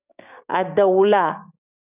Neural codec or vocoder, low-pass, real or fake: codec, 16 kHz, 6 kbps, DAC; 3.6 kHz; fake